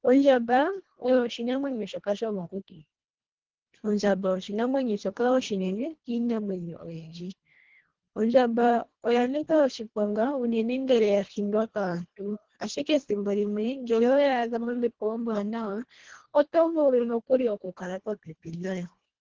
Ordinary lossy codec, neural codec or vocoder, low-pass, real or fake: Opus, 16 kbps; codec, 24 kHz, 1.5 kbps, HILCodec; 7.2 kHz; fake